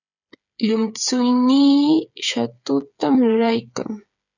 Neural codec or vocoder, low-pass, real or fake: codec, 16 kHz, 8 kbps, FreqCodec, smaller model; 7.2 kHz; fake